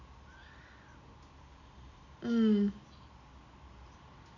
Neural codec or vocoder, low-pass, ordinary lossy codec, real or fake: none; 7.2 kHz; none; real